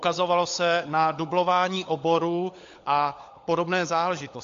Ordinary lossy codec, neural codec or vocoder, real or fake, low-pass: AAC, 48 kbps; codec, 16 kHz, 16 kbps, FunCodec, trained on LibriTTS, 50 frames a second; fake; 7.2 kHz